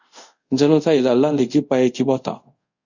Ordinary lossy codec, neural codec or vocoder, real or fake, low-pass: Opus, 64 kbps; codec, 24 kHz, 0.5 kbps, DualCodec; fake; 7.2 kHz